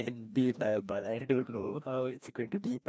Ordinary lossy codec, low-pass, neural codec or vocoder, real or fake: none; none; codec, 16 kHz, 1 kbps, FreqCodec, larger model; fake